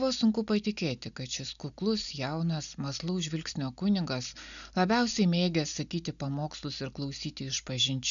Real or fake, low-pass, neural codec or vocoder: real; 7.2 kHz; none